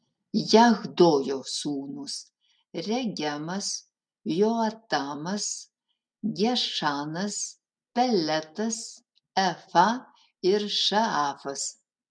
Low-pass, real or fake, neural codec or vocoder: 9.9 kHz; real; none